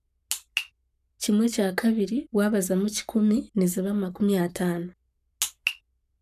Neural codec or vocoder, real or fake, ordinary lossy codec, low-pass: codec, 44.1 kHz, 7.8 kbps, Pupu-Codec; fake; none; 14.4 kHz